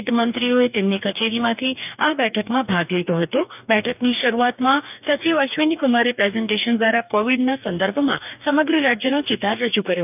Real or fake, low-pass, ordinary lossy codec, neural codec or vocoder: fake; 3.6 kHz; none; codec, 44.1 kHz, 2.6 kbps, DAC